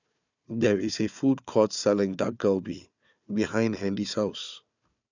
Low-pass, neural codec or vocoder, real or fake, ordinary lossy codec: 7.2 kHz; codec, 16 kHz, 4 kbps, FunCodec, trained on Chinese and English, 50 frames a second; fake; none